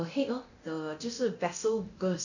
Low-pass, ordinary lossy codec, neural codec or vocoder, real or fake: 7.2 kHz; none; codec, 24 kHz, 0.9 kbps, DualCodec; fake